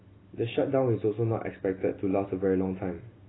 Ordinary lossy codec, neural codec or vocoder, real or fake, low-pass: AAC, 16 kbps; none; real; 7.2 kHz